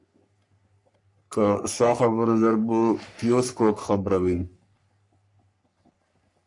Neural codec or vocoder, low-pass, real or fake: codec, 44.1 kHz, 3.4 kbps, Pupu-Codec; 10.8 kHz; fake